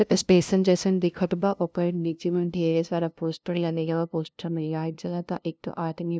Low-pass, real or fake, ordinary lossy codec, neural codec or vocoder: none; fake; none; codec, 16 kHz, 0.5 kbps, FunCodec, trained on LibriTTS, 25 frames a second